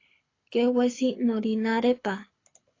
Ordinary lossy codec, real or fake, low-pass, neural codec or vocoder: AAC, 32 kbps; fake; 7.2 kHz; codec, 24 kHz, 6 kbps, HILCodec